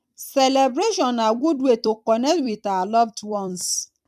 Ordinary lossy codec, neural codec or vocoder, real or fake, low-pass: none; vocoder, 44.1 kHz, 128 mel bands every 256 samples, BigVGAN v2; fake; 14.4 kHz